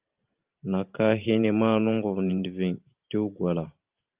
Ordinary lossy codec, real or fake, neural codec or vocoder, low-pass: Opus, 32 kbps; fake; vocoder, 44.1 kHz, 128 mel bands every 512 samples, BigVGAN v2; 3.6 kHz